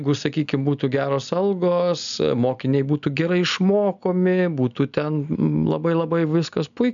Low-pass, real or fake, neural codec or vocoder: 7.2 kHz; real; none